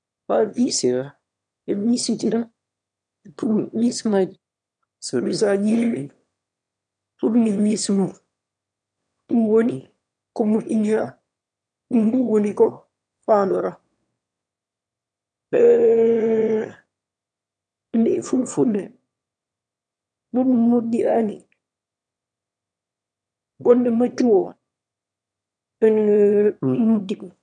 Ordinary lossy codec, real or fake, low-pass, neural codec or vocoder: none; fake; 9.9 kHz; autoencoder, 22.05 kHz, a latent of 192 numbers a frame, VITS, trained on one speaker